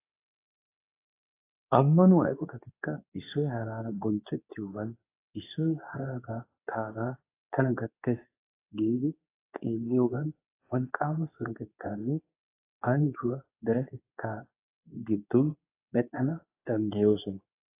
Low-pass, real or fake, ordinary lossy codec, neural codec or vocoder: 3.6 kHz; fake; AAC, 24 kbps; codec, 24 kHz, 0.9 kbps, WavTokenizer, medium speech release version 2